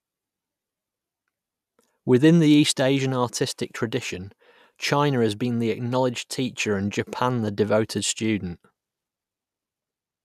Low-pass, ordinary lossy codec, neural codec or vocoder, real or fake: 14.4 kHz; none; none; real